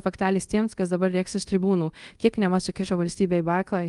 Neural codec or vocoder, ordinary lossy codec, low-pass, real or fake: codec, 24 kHz, 0.5 kbps, DualCodec; Opus, 32 kbps; 10.8 kHz; fake